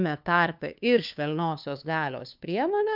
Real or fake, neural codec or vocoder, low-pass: fake; codec, 16 kHz, 2 kbps, FunCodec, trained on LibriTTS, 25 frames a second; 5.4 kHz